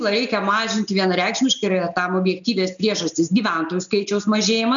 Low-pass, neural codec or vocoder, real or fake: 7.2 kHz; none; real